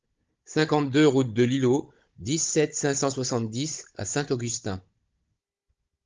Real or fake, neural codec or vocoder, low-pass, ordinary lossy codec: fake; codec, 16 kHz, 16 kbps, FunCodec, trained on Chinese and English, 50 frames a second; 7.2 kHz; Opus, 32 kbps